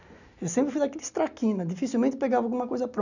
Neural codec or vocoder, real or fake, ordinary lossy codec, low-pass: none; real; none; 7.2 kHz